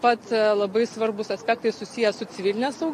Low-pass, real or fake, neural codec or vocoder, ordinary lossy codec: 14.4 kHz; real; none; MP3, 64 kbps